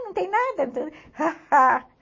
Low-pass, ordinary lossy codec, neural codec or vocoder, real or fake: 7.2 kHz; MP3, 32 kbps; none; real